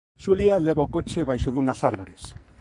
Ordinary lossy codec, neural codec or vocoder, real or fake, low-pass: MP3, 96 kbps; codec, 44.1 kHz, 2.6 kbps, SNAC; fake; 10.8 kHz